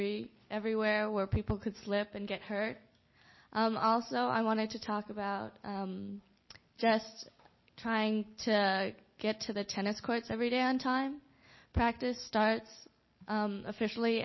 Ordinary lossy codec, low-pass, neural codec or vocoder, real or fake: MP3, 24 kbps; 7.2 kHz; none; real